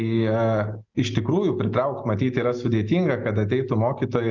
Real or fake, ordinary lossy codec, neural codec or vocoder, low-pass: real; Opus, 24 kbps; none; 7.2 kHz